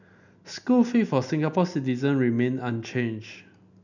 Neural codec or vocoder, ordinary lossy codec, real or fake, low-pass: none; none; real; 7.2 kHz